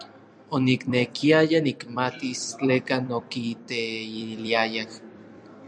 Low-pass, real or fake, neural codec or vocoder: 9.9 kHz; fake; vocoder, 44.1 kHz, 128 mel bands every 256 samples, BigVGAN v2